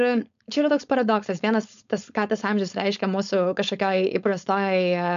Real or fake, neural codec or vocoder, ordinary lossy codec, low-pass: fake; codec, 16 kHz, 4.8 kbps, FACodec; MP3, 96 kbps; 7.2 kHz